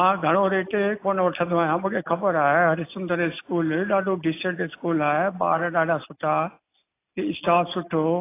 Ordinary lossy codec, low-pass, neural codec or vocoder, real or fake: AAC, 24 kbps; 3.6 kHz; none; real